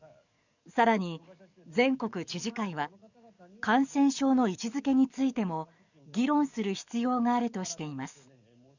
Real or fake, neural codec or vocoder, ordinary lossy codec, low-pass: fake; codec, 44.1 kHz, 7.8 kbps, DAC; none; 7.2 kHz